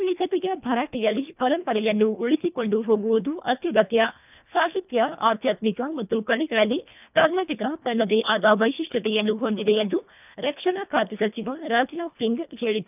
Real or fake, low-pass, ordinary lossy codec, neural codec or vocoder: fake; 3.6 kHz; none; codec, 24 kHz, 1.5 kbps, HILCodec